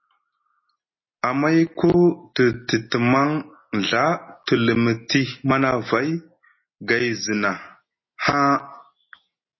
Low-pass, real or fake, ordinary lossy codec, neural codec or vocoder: 7.2 kHz; real; MP3, 24 kbps; none